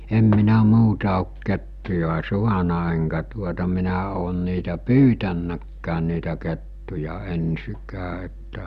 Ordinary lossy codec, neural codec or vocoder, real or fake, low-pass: Opus, 64 kbps; none; real; 14.4 kHz